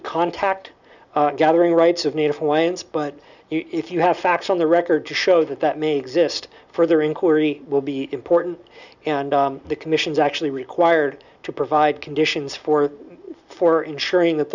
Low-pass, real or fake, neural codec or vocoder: 7.2 kHz; real; none